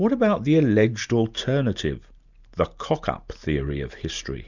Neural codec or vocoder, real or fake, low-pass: none; real; 7.2 kHz